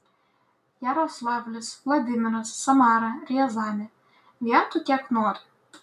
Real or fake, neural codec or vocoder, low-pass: real; none; 14.4 kHz